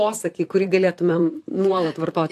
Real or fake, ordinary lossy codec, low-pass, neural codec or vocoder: fake; AAC, 64 kbps; 14.4 kHz; vocoder, 44.1 kHz, 128 mel bands, Pupu-Vocoder